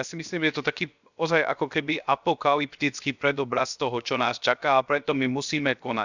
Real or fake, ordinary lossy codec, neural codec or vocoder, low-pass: fake; none; codec, 16 kHz, 0.7 kbps, FocalCodec; 7.2 kHz